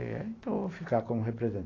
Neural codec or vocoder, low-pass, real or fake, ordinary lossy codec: none; 7.2 kHz; real; AAC, 32 kbps